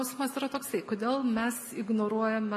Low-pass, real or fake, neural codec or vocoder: 14.4 kHz; real; none